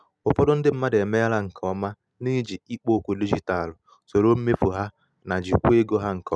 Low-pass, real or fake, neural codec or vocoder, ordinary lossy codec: none; real; none; none